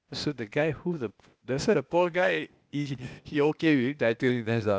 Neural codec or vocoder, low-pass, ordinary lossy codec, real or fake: codec, 16 kHz, 0.8 kbps, ZipCodec; none; none; fake